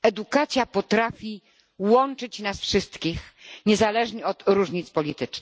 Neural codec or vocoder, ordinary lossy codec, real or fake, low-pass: none; none; real; none